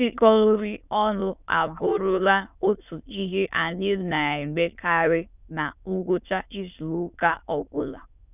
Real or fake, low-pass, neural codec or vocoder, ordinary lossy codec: fake; 3.6 kHz; autoencoder, 22.05 kHz, a latent of 192 numbers a frame, VITS, trained on many speakers; none